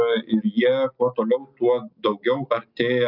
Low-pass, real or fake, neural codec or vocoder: 5.4 kHz; real; none